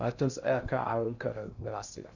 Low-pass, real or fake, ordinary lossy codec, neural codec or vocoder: 7.2 kHz; fake; none; codec, 16 kHz in and 24 kHz out, 0.6 kbps, FocalCodec, streaming, 2048 codes